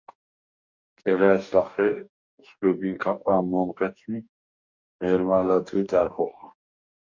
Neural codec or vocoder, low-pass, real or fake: codec, 44.1 kHz, 2.6 kbps, DAC; 7.2 kHz; fake